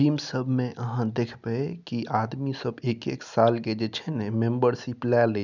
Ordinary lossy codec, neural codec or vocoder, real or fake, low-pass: none; none; real; 7.2 kHz